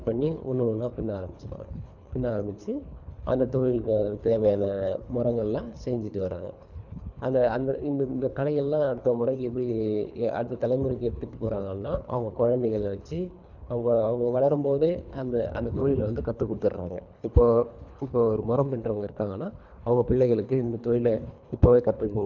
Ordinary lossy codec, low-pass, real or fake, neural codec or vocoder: none; 7.2 kHz; fake; codec, 24 kHz, 3 kbps, HILCodec